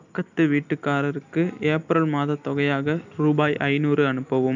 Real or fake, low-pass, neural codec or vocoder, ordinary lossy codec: real; 7.2 kHz; none; none